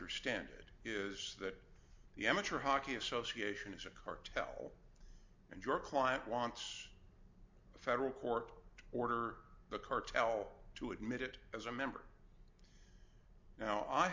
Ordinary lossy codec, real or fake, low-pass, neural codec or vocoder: MP3, 64 kbps; real; 7.2 kHz; none